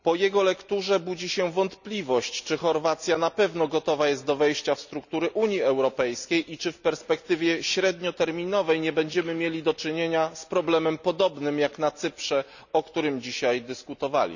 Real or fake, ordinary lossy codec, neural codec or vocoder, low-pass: real; none; none; 7.2 kHz